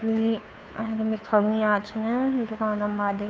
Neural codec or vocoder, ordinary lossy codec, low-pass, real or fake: codec, 16 kHz, 2 kbps, FunCodec, trained on Chinese and English, 25 frames a second; none; none; fake